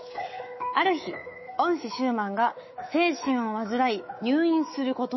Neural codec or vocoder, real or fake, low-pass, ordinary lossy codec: codec, 24 kHz, 3.1 kbps, DualCodec; fake; 7.2 kHz; MP3, 24 kbps